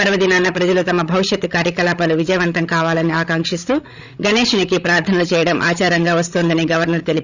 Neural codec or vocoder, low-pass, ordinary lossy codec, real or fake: codec, 16 kHz, 16 kbps, FreqCodec, larger model; 7.2 kHz; Opus, 64 kbps; fake